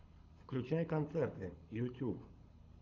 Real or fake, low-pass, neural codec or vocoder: fake; 7.2 kHz; codec, 24 kHz, 6 kbps, HILCodec